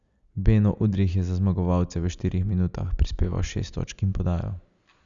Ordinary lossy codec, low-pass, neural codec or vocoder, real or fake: Opus, 64 kbps; 7.2 kHz; none; real